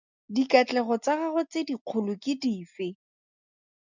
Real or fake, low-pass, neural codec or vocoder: real; 7.2 kHz; none